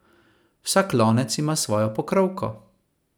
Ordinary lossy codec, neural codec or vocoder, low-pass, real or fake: none; none; none; real